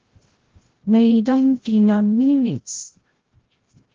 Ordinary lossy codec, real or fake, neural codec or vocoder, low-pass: Opus, 16 kbps; fake; codec, 16 kHz, 0.5 kbps, FreqCodec, larger model; 7.2 kHz